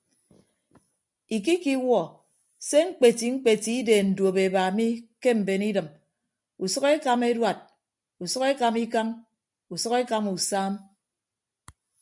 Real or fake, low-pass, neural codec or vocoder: real; 10.8 kHz; none